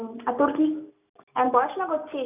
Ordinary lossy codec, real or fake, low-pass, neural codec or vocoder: none; real; 3.6 kHz; none